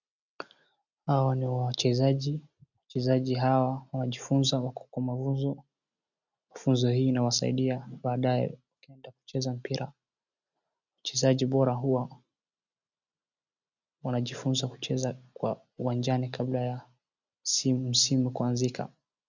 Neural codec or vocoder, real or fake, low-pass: none; real; 7.2 kHz